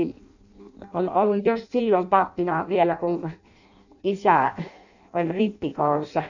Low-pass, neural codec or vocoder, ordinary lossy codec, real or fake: 7.2 kHz; codec, 16 kHz in and 24 kHz out, 0.6 kbps, FireRedTTS-2 codec; none; fake